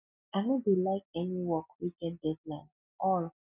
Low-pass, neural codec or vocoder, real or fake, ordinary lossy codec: 3.6 kHz; none; real; none